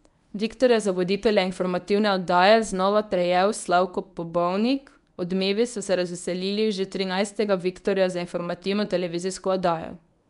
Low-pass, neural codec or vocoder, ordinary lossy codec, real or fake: 10.8 kHz; codec, 24 kHz, 0.9 kbps, WavTokenizer, medium speech release version 1; none; fake